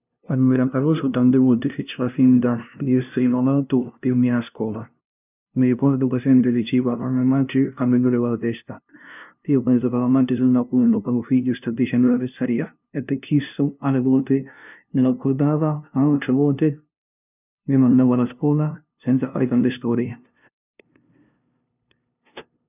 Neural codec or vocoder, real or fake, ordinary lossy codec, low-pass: codec, 16 kHz, 0.5 kbps, FunCodec, trained on LibriTTS, 25 frames a second; fake; none; 3.6 kHz